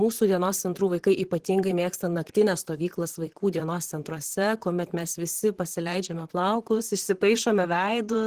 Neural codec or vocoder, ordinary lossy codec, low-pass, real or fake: vocoder, 44.1 kHz, 128 mel bands, Pupu-Vocoder; Opus, 16 kbps; 14.4 kHz; fake